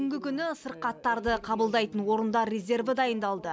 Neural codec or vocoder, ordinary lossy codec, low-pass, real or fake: none; none; none; real